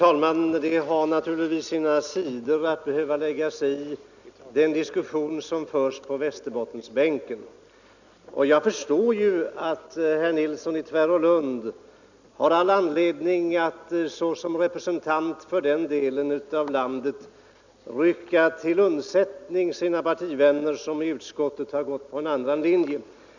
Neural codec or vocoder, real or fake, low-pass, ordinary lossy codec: none; real; 7.2 kHz; none